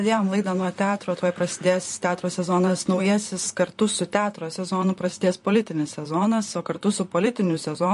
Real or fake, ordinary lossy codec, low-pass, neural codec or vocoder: fake; MP3, 48 kbps; 14.4 kHz; vocoder, 44.1 kHz, 128 mel bands, Pupu-Vocoder